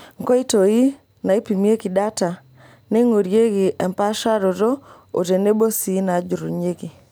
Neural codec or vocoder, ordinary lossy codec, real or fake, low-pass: none; none; real; none